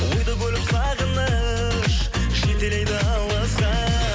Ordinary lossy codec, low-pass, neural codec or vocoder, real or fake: none; none; none; real